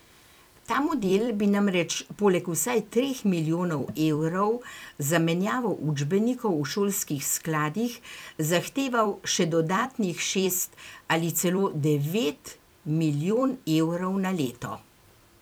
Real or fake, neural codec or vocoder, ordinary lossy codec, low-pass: fake; vocoder, 44.1 kHz, 128 mel bands every 256 samples, BigVGAN v2; none; none